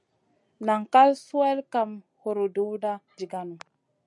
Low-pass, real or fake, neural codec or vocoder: 10.8 kHz; real; none